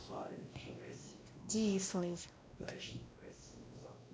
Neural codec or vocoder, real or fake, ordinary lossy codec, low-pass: codec, 16 kHz, 1 kbps, X-Codec, WavLM features, trained on Multilingual LibriSpeech; fake; none; none